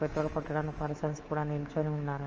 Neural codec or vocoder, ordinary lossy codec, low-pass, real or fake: codec, 24 kHz, 3.1 kbps, DualCodec; Opus, 24 kbps; 7.2 kHz; fake